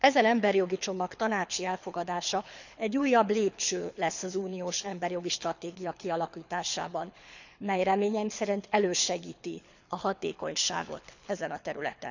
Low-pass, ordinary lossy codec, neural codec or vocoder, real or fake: 7.2 kHz; none; codec, 24 kHz, 6 kbps, HILCodec; fake